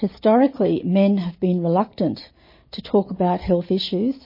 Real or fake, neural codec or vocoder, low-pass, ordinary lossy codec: real; none; 5.4 kHz; MP3, 24 kbps